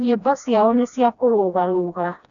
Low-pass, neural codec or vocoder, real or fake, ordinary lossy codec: 7.2 kHz; codec, 16 kHz, 1 kbps, FreqCodec, smaller model; fake; none